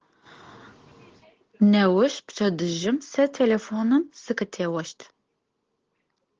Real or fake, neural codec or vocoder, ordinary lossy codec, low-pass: real; none; Opus, 16 kbps; 7.2 kHz